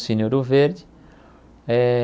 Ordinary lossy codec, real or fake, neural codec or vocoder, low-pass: none; real; none; none